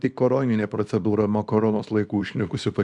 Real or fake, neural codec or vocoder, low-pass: fake; codec, 24 kHz, 0.9 kbps, WavTokenizer, small release; 10.8 kHz